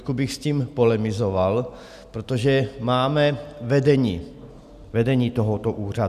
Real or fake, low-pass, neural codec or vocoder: real; 14.4 kHz; none